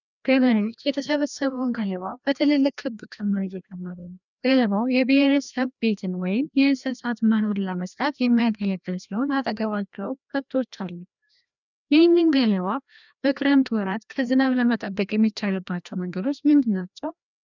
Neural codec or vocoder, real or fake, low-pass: codec, 16 kHz, 1 kbps, FreqCodec, larger model; fake; 7.2 kHz